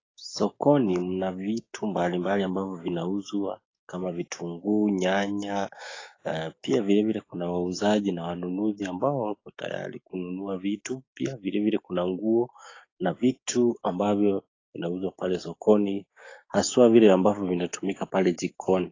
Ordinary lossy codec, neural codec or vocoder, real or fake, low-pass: AAC, 32 kbps; codec, 16 kHz, 6 kbps, DAC; fake; 7.2 kHz